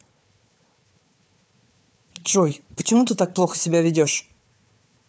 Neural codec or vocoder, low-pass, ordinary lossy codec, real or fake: codec, 16 kHz, 4 kbps, FunCodec, trained on Chinese and English, 50 frames a second; none; none; fake